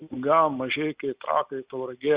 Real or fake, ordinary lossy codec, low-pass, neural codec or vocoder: real; Opus, 64 kbps; 3.6 kHz; none